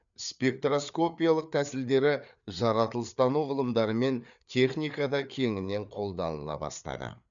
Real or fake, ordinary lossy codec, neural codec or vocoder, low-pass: fake; none; codec, 16 kHz, 4 kbps, FreqCodec, larger model; 7.2 kHz